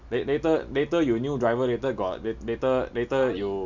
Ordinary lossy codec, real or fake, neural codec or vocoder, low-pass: none; real; none; 7.2 kHz